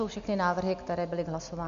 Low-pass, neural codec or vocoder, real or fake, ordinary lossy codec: 7.2 kHz; none; real; AAC, 64 kbps